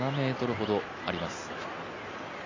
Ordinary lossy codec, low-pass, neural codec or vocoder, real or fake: AAC, 32 kbps; 7.2 kHz; none; real